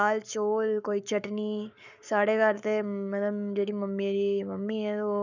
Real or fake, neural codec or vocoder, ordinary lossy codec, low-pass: fake; codec, 44.1 kHz, 7.8 kbps, Pupu-Codec; none; 7.2 kHz